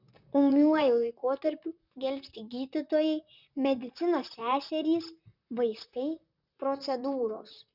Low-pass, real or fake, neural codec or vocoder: 5.4 kHz; fake; vocoder, 44.1 kHz, 128 mel bands, Pupu-Vocoder